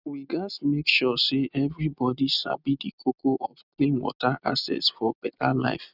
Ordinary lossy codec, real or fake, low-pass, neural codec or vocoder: none; real; 5.4 kHz; none